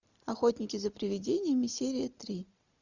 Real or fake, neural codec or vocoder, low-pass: real; none; 7.2 kHz